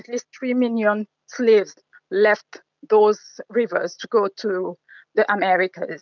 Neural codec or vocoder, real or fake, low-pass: none; real; 7.2 kHz